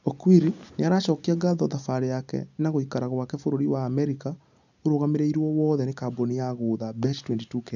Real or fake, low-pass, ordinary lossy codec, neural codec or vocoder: real; 7.2 kHz; none; none